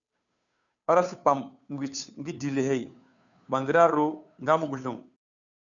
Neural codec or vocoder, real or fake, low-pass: codec, 16 kHz, 2 kbps, FunCodec, trained on Chinese and English, 25 frames a second; fake; 7.2 kHz